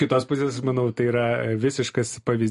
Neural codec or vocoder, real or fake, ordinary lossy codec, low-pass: none; real; MP3, 48 kbps; 14.4 kHz